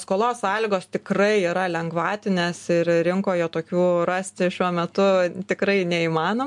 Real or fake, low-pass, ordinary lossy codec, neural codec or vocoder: real; 10.8 kHz; MP3, 64 kbps; none